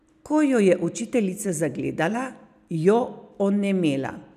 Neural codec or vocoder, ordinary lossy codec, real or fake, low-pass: none; none; real; 14.4 kHz